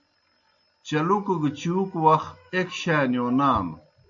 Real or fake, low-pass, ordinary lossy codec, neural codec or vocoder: real; 7.2 kHz; AAC, 64 kbps; none